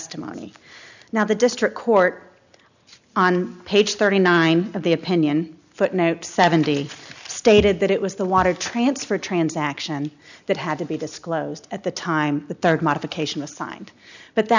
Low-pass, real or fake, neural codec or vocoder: 7.2 kHz; real; none